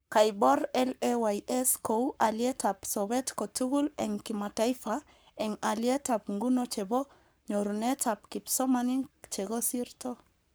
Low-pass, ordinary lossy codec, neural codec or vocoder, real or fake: none; none; codec, 44.1 kHz, 7.8 kbps, Pupu-Codec; fake